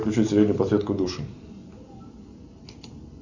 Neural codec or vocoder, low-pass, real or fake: none; 7.2 kHz; real